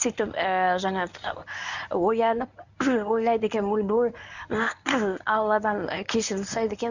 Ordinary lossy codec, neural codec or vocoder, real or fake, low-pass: none; codec, 24 kHz, 0.9 kbps, WavTokenizer, medium speech release version 1; fake; 7.2 kHz